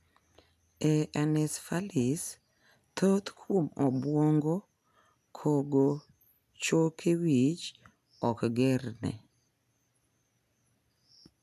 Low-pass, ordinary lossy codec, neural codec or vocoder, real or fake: 14.4 kHz; none; none; real